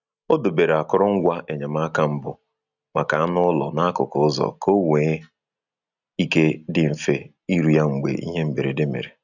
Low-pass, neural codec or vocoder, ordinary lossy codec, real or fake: 7.2 kHz; none; none; real